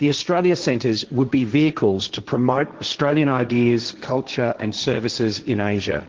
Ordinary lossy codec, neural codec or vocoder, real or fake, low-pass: Opus, 16 kbps; codec, 16 kHz, 1.1 kbps, Voila-Tokenizer; fake; 7.2 kHz